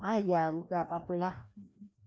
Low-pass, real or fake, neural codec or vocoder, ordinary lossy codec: none; fake; codec, 16 kHz, 1 kbps, FreqCodec, larger model; none